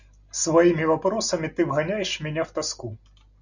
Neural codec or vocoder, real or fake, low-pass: none; real; 7.2 kHz